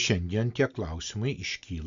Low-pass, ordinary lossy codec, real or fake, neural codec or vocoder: 7.2 kHz; Opus, 64 kbps; real; none